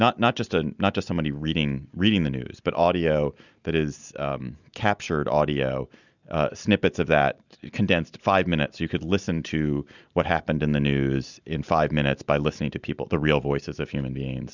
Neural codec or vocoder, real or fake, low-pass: none; real; 7.2 kHz